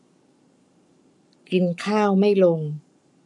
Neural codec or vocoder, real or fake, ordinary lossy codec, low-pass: codec, 44.1 kHz, 7.8 kbps, Pupu-Codec; fake; none; 10.8 kHz